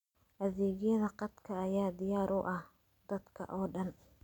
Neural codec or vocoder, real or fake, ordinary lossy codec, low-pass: none; real; none; 19.8 kHz